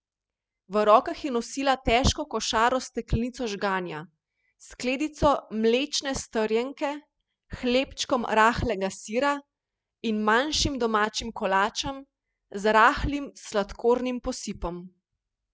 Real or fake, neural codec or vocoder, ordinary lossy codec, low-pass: real; none; none; none